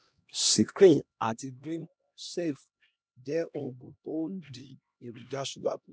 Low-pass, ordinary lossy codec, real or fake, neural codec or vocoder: none; none; fake; codec, 16 kHz, 1 kbps, X-Codec, HuBERT features, trained on LibriSpeech